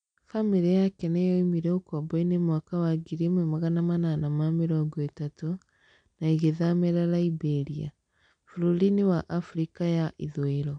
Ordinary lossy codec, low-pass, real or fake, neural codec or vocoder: none; 9.9 kHz; real; none